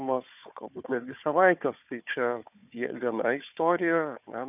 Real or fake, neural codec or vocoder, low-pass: fake; codec, 16 kHz, 4 kbps, FunCodec, trained on Chinese and English, 50 frames a second; 3.6 kHz